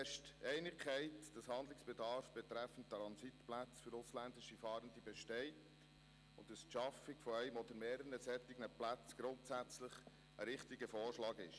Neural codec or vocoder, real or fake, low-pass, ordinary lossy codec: none; real; none; none